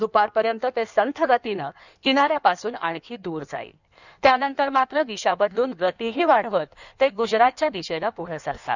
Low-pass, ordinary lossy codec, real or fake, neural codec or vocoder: 7.2 kHz; none; fake; codec, 16 kHz in and 24 kHz out, 1.1 kbps, FireRedTTS-2 codec